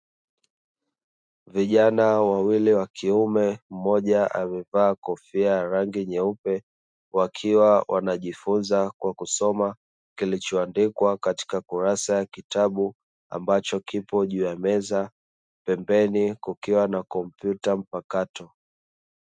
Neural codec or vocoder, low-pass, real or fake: none; 9.9 kHz; real